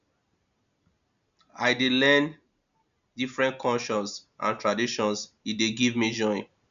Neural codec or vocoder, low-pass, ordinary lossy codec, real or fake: none; 7.2 kHz; none; real